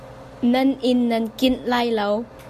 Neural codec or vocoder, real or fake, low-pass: none; real; 14.4 kHz